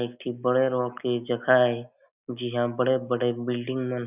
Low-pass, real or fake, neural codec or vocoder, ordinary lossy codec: 3.6 kHz; real; none; none